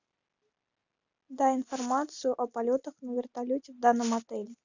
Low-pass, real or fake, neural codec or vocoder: 7.2 kHz; real; none